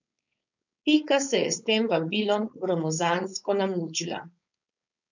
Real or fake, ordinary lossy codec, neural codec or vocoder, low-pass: fake; none; codec, 16 kHz, 4.8 kbps, FACodec; 7.2 kHz